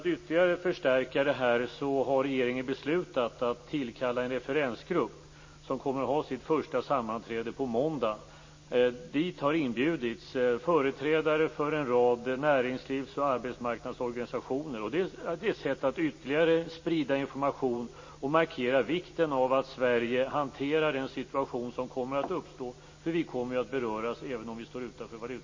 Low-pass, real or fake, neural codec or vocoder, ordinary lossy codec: 7.2 kHz; real; none; MP3, 32 kbps